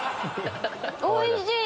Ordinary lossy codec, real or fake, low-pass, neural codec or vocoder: none; real; none; none